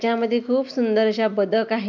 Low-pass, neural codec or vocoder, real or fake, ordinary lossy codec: 7.2 kHz; none; real; none